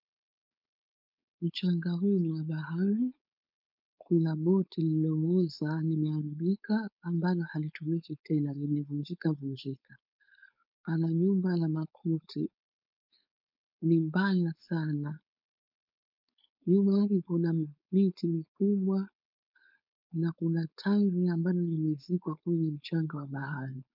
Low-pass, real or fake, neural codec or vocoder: 5.4 kHz; fake; codec, 16 kHz, 4.8 kbps, FACodec